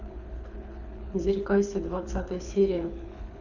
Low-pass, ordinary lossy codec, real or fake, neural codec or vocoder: 7.2 kHz; none; fake; codec, 24 kHz, 6 kbps, HILCodec